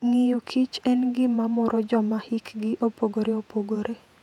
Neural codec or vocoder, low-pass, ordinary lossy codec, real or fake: vocoder, 48 kHz, 128 mel bands, Vocos; 19.8 kHz; none; fake